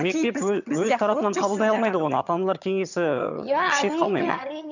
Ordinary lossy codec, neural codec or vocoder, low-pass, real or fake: none; vocoder, 22.05 kHz, 80 mel bands, HiFi-GAN; 7.2 kHz; fake